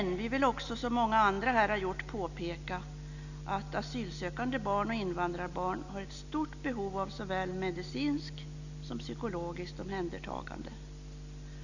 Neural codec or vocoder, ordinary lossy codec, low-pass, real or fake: none; none; 7.2 kHz; real